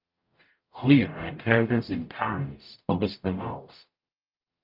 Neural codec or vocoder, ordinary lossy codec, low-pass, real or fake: codec, 44.1 kHz, 0.9 kbps, DAC; Opus, 24 kbps; 5.4 kHz; fake